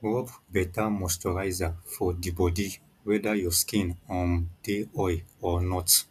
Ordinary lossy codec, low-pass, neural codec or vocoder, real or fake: none; 14.4 kHz; none; real